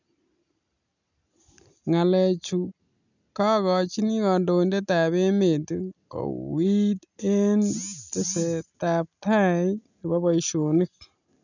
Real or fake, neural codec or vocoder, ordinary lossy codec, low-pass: real; none; none; 7.2 kHz